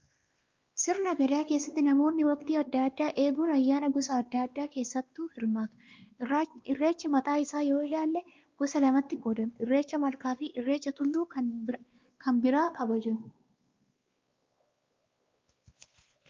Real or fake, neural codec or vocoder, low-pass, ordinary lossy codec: fake; codec, 16 kHz, 2 kbps, X-Codec, WavLM features, trained on Multilingual LibriSpeech; 7.2 kHz; Opus, 24 kbps